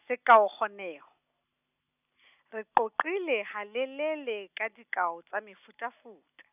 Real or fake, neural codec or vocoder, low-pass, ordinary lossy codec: real; none; 3.6 kHz; none